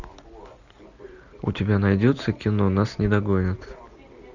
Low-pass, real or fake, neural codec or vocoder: 7.2 kHz; real; none